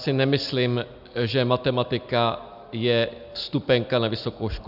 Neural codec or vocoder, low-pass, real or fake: none; 5.4 kHz; real